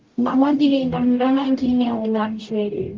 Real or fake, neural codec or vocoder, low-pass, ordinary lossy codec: fake; codec, 44.1 kHz, 0.9 kbps, DAC; 7.2 kHz; Opus, 32 kbps